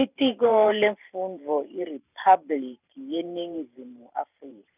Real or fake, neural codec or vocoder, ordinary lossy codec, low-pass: fake; vocoder, 44.1 kHz, 128 mel bands every 512 samples, BigVGAN v2; none; 3.6 kHz